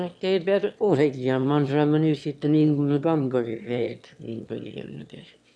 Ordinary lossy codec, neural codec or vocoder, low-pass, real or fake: none; autoencoder, 22.05 kHz, a latent of 192 numbers a frame, VITS, trained on one speaker; none; fake